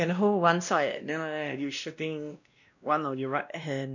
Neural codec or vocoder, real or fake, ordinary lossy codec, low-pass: codec, 16 kHz, 1 kbps, X-Codec, WavLM features, trained on Multilingual LibriSpeech; fake; none; 7.2 kHz